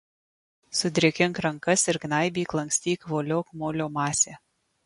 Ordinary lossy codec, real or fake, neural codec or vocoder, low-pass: MP3, 48 kbps; real; none; 14.4 kHz